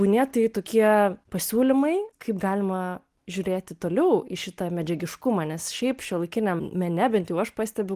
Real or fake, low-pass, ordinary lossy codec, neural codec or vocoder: real; 14.4 kHz; Opus, 24 kbps; none